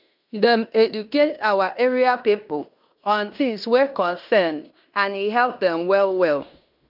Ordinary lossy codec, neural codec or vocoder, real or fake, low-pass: none; codec, 16 kHz in and 24 kHz out, 0.9 kbps, LongCat-Audio-Codec, fine tuned four codebook decoder; fake; 5.4 kHz